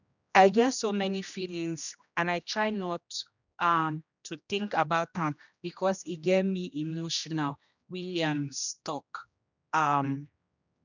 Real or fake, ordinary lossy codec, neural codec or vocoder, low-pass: fake; none; codec, 16 kHz, 1 kbps, X-Codec, HuBERT features, trained on general audio; 7.2 kHz